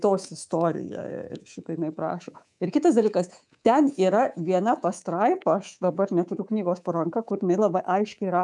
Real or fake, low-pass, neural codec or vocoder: fake; 10.8 kHz; codec, 24 kHz, 3.1 kbps, DualCodec